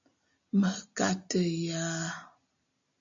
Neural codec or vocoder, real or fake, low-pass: none; real; 7.2 kHz